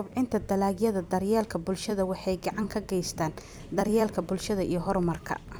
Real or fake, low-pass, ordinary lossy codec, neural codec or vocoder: fake; none; none; vocoder, 44.1 kHz, 128 mel bands every 256 samples, BigVGAN v2